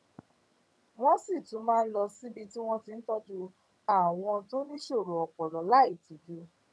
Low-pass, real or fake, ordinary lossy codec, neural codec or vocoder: none; fake; none; vocoder, 22.05 kHz, 80 mel bands, HiFi-GAN